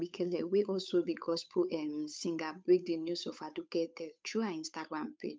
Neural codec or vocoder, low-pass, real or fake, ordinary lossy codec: codec, 16 kHz, 8 kbps, FunCodec, trained on Chinese and English, 25 frames a second; none; fake; none